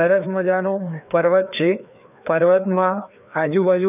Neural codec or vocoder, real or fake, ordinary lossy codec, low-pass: codec, 16 kHz, 2 kbps, FreqCodec, larger model; fake; none; 3.6 kHz